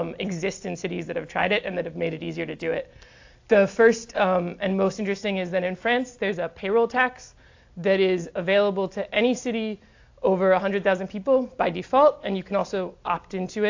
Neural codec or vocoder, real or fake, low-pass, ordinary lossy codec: none; real; 7.2 kHz; AAC, 48 kbps